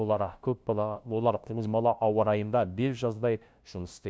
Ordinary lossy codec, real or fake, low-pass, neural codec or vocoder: none; fake; none; codec, 16 kHz, 0.5 kbps, FunCodec, trained on LibriTTS, 25 frames a second